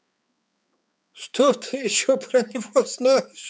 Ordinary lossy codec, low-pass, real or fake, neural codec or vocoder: none; none; fake; codec, 16 kHz, 4 kbps, X-Codec, HuBERT features, trained on balanced general audio